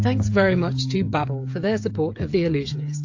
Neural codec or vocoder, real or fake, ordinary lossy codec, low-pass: codec, 16 kHz, 8 kbps, FreqCodec, smaller model; fake; AAC, 48 kbps; 7.2 kHz